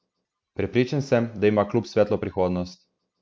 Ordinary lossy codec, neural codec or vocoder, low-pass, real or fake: none; none; none; real